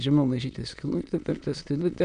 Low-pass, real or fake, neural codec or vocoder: 9.9 kHz; fake; autoencoder, 22.05 kHz, a latent of 192 numbers a frame, VITS, trained on many speakers